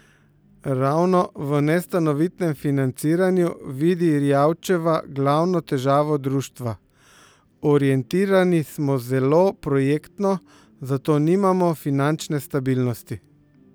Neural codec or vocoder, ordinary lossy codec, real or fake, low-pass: none; none; real; none